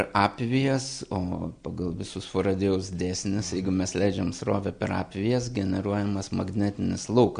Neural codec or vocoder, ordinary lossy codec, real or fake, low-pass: none; MP3, 96 kbps; real; 9.9 kHz